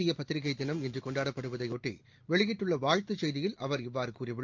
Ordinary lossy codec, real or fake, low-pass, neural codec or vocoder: Opus, 32 kbps; real; 7.2 kHz; none